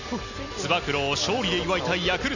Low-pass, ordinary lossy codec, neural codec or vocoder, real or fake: 7.2 kHz; none; none; real